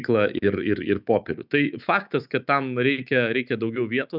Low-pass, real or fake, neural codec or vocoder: 5.4 kHz; fake; autoencoder, 48 kHz, 128 numbers a frame, DAC-VAE, trained on Japanese speech